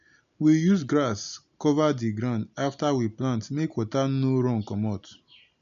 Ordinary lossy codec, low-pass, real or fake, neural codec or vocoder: none; 7.2 kHz; real; none